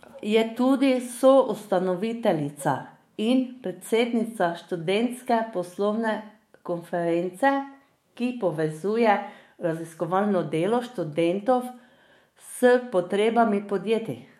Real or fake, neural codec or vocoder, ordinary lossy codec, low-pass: fake; autoencoder, 48 kHz, 128 numbers a frame, DAC-VAE, trained on Japanese speech; MP3, 64 kbps; 19.8 kHz